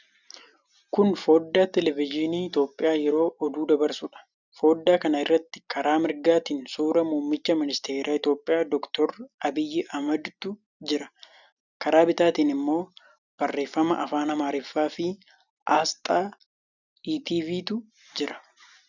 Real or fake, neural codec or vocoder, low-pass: real; none; 7.2 kHz